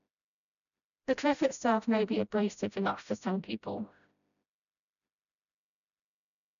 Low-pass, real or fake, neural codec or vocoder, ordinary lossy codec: 7.2 kHz; fake; codec, 16 kHz, 0.5 kbps, FreqCodec, smaller model; none